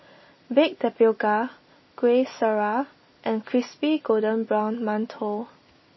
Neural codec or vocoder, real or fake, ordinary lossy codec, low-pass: none; real; MP3, 24 kbps; 7.2 kHz